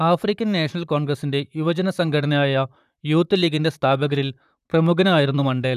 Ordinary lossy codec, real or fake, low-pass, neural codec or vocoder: none; fake; 14.4 kHz; vocoder, 44.1 kHz, 128 mel bands, Pupu-Vocoder